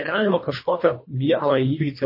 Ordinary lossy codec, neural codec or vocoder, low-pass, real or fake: MP3, 24 kbps; codec, 24 kHz, 1.5 kbps, HILCodec; 5.4 kHz; fake